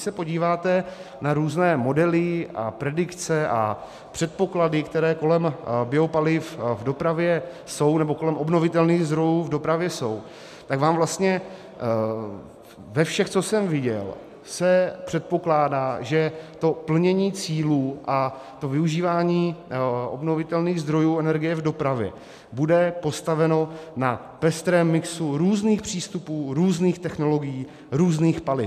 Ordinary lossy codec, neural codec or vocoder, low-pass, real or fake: MP3, 96 kbps; none; 14.4 kHz; real